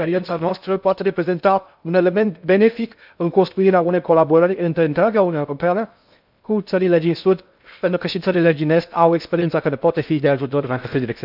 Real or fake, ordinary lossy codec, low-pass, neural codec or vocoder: fake; none; 5.4 kHz; codec, 16 kHz in and 24 kHz out, 0.6 kbps, FocalCodec, streaming, 2048 codes